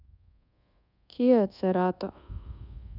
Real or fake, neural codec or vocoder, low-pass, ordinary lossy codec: fake; codec, 24 kHz, 1.2 kbps, DualCodec; 5.4 kHz; none